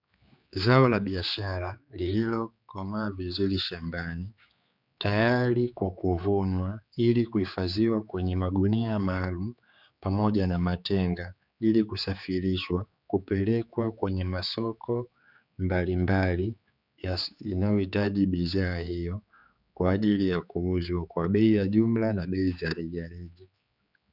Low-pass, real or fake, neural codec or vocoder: 5.4 kHz; fake; codec, 16 kHz, 4 kbps, X-Codec, HuBERT features, trained on general audio